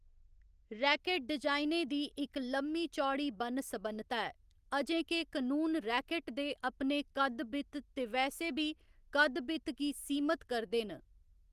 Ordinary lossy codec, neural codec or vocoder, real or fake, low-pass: Opus, 24 kbps; none; real; 14.4 kHz